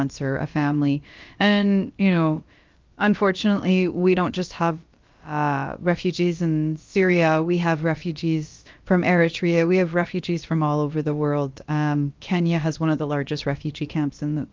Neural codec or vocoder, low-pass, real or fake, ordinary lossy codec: codec, 16 kHz, about 1 kbps, DyCAST, with the encoder's durations; 7.2 kHz; fake; Opus, 32 kbps